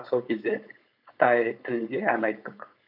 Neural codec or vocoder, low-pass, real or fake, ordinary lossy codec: codec, 16 kHz, 4.8 kbps, FACodec; 5.4 kHz; fake; none